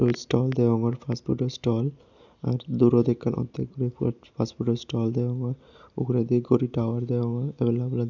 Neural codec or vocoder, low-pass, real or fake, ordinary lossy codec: none; 7.2 kHz; real; none